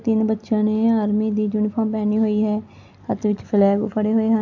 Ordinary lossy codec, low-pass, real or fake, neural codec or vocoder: AAC, 48 kbps; 7.2 kHz; real; none